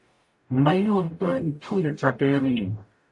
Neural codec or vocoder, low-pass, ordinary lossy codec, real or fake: codec, 44.1 kHz, 0.9 kbps, DAC; 10.8 kHz; AAC, 64 kbps; fake